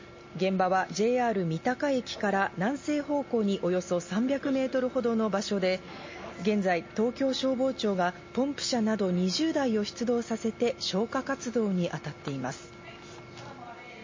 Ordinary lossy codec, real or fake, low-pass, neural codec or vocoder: MP3, 32 kbps; real; 7.2 kHz; none